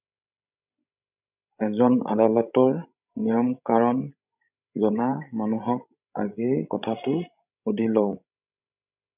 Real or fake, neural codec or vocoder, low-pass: fake; codec, 16 kHz, 16 kbps, FreqCodec, larger model; 3.6 kHz